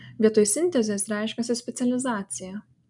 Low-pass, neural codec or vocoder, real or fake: 10.8 kHz; none; real